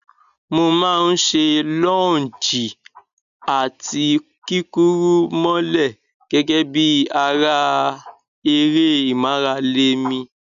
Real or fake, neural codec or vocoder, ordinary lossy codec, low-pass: real; none; none; 7.2 kHz